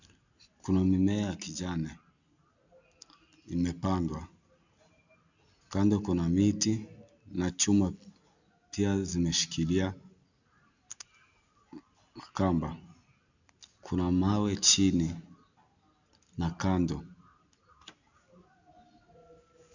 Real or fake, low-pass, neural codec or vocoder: real; 7.2 kHz; none